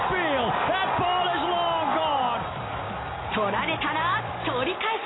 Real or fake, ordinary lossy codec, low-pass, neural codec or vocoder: real; AAC, 16 kbps; 7.2 kHz; none